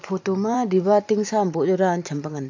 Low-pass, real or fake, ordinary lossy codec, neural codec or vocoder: 7.2 kHz; fake; AAC, 48 kbps; vocoder, 44.1 kHz, 128 mel bands every 512 samples, BigVGAN v2